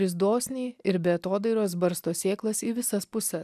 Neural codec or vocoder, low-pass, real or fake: none; 14.4 kHz; real